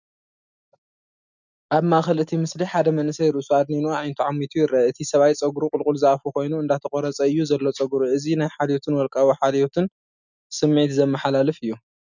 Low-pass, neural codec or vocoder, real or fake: 7.2 kHz; none; real